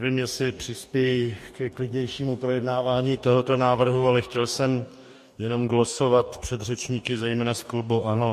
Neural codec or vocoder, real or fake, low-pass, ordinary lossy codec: codec, 44.1 kHz, 2.6 kbps, DAC; fake; 14.4 kHz; MP3, 64 kbps